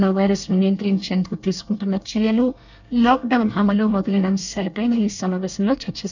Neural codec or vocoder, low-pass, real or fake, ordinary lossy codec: codec, 24 kHz, 1 kbps, SNAC; 7.2 kHz; fake; none